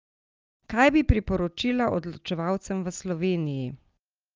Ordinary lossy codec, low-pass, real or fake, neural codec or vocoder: Opus, 24 kbps; 7.2 kHz; real; none